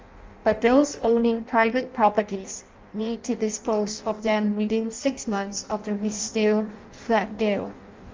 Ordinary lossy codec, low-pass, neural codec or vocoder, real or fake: Opus, 32 kbps; 7.2 kHz; codec, 16 kHz in and 24 kHz out, 0.6 kbps, FireRedTTS-2 codec; fake